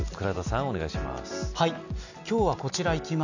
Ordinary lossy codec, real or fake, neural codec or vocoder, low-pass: none; real; none; 7.2 kHz